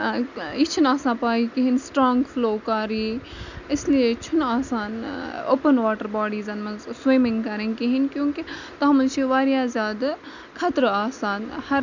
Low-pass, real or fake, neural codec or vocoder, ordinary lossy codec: 7.2 kHz; real; none; none